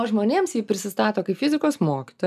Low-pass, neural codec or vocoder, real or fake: 14.4 kHz; none; real